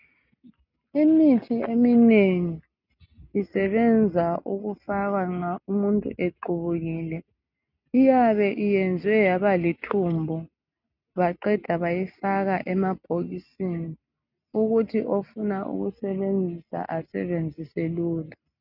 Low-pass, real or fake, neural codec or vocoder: 5.4 kHz; real; none